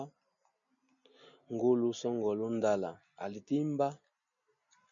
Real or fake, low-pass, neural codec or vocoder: real; 7.2 kHz; none